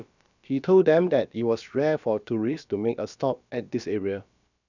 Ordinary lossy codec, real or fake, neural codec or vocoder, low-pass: none; fake; codec, 16 kHz, about 1 kbps, DyCAST, with the encoder's durations; 7.2 kHz